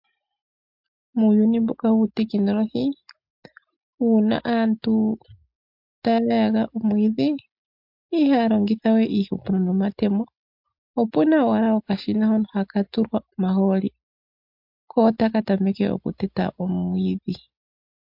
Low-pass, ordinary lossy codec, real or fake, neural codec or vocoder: 5.4 kHz; MP3, 48 kbps; real; none